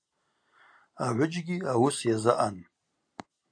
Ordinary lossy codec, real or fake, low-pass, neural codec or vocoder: AAC, 64 kbps; real; 9.9 kHz; none